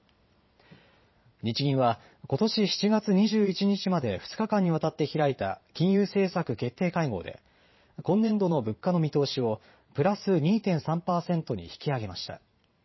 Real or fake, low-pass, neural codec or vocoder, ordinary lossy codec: fake; 7.2 kHz; vocoder, 22.05 kHz, 80 mel bands, Vocos; MP3, 24 kbps